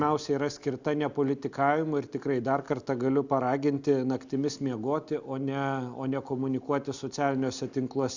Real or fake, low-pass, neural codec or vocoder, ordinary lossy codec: real; 7.2 kHz; none; Opus, 64 kbps